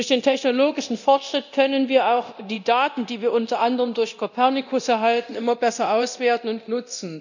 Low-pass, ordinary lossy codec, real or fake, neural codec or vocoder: 7.2 kHz; none; fake; codec, 24 kHz, 0.9 kbps, DualCodec